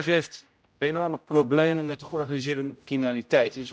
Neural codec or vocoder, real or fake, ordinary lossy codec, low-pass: codec, 16 kHz, 0.5 kbps, X-Codec, HuBERT features, trained on general audio; fake; none; none